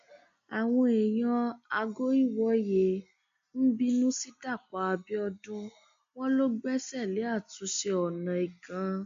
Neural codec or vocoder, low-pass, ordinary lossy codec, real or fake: none; 7.2 kHz; MP3, 48 kbps; real